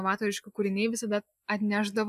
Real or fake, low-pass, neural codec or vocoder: real; 14.4 kHz; none